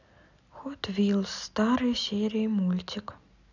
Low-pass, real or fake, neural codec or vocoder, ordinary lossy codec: 7.2 kHz; real; none; none